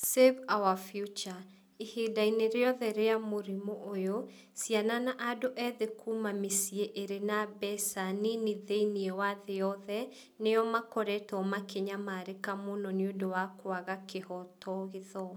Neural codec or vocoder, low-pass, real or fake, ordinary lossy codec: none; none; real; none